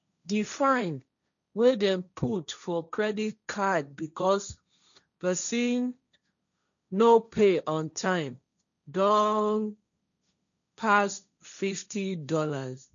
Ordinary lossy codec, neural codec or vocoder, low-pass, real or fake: none; codec, 16 kHz, 1.1 kbps, Voila-Tokenizer; 7.2 kHz; fake